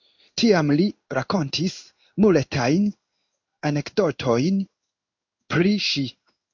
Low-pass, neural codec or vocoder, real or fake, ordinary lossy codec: 7.2 kHz; codec, 16 kHz in and 24 kHz out, 1 kbps, XY-Tokenizer; fake; MP3, 64 kbps